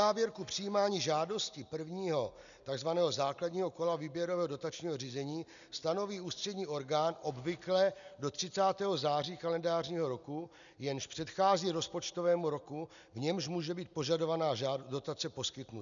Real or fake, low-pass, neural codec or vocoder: real; 7.2 kHz; none